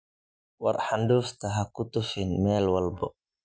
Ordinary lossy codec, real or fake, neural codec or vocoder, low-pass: none; real; none; none